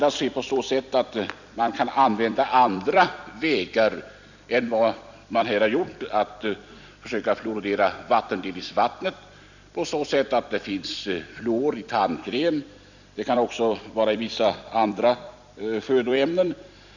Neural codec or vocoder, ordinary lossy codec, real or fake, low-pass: vocoder, 44.1 kHz, 128 mel bands every 256 samples, BigVGAN v2; none; fake; 7.2 kHz